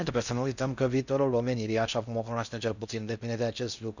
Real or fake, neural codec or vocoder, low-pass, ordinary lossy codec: fake; codec, 16 kHz in and 24 kHz out, 0.6 kbps, FocalCodec, streaming, 4096 codes; 7.2 kHz; none